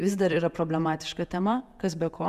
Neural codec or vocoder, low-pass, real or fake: vocoder, 48 kHz, 128 mel bands, Vocos; 14.4 kHz; fake